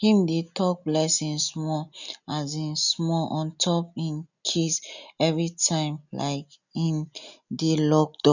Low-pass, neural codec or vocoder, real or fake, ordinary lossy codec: 7.2 kHz; none; real; none